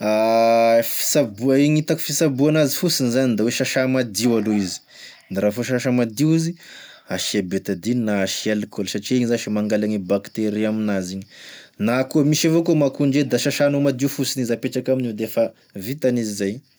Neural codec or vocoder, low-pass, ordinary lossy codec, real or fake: none; none; none; real